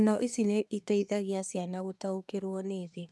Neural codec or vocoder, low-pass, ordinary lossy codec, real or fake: codec, 24 kHz, 1 kbps, SNAC; none; none; fake